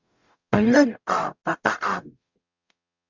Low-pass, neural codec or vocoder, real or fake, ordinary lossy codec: 7.2 kHz; codec, 44.1 kHz, 0.9 kbps, DAC; fake; Opus, 64 kbps